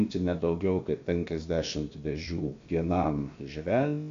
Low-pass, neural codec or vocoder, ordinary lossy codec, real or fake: 7.2 kHz; codec, 16 kHz, about 1 kbps, DyCAST, with the encoder's durations; AAC, 48 kbps; fake